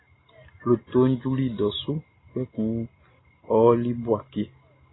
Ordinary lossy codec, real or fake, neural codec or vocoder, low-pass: AAC, 16 kbps; real; none; 7.2 kHz